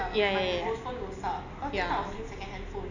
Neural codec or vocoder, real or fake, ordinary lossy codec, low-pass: none; real; none; 7.2 kHz